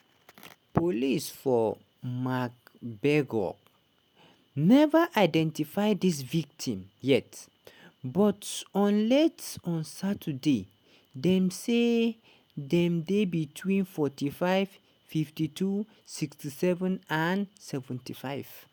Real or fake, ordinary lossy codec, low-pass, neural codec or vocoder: real; none; none; none